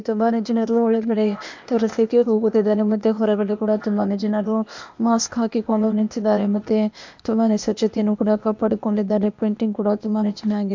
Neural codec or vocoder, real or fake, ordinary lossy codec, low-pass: codec, 16 kHz, 0.8 kbps, ZipCodec; fake; MP3, 64 kbps; 7.2 kHz